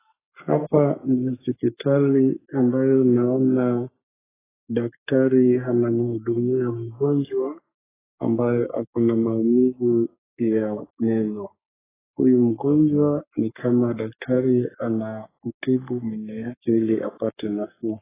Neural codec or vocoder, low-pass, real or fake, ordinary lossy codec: codec, 44.1 kHz, 3.4 kbps, Pupu-Codec; 3.6 kHz; fake; AAC, 16 kbps